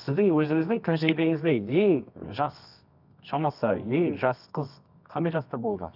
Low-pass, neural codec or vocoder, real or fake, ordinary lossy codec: 5.4 kHz; codec, 24 kHz, 0.9 kbps, WavTokenizer, medium music audio release; fake; none